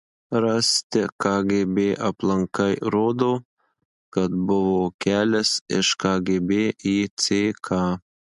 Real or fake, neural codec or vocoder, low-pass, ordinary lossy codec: real; none; 10.8 kHz; MP3, 64 kbps